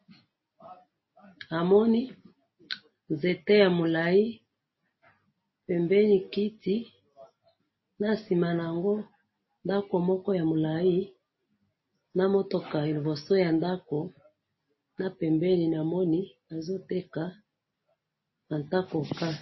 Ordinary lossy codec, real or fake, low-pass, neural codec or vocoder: MP3, 24 kbps; real; 7.2 kHz; none